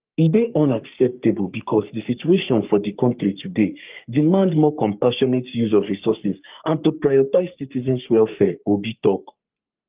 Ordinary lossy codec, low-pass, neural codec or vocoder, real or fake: Opus, 24 kbps; 3.6 kHz; codec, 44.1 kHz, 3.4 kbps, Pupu-Codec; fake